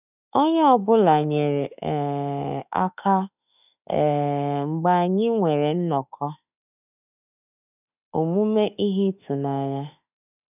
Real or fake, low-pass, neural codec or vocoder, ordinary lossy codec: fake; 3.6 kHz; autoencoder, 48 kHz, 32 numbers a frame, DAC-VAE, trained on Japanese speech; none